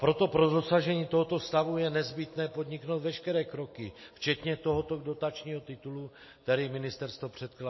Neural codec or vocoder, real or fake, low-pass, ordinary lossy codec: none; real; 7.2 kHz; MP3, 24 kbps